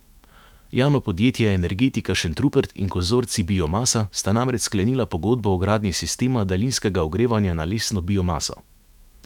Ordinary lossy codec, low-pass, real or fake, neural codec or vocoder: none; 19.8 kHz; fake; autoencoder, 48 kHz, 128 numbers a frame, DAC-VAE, trained on Japanese speech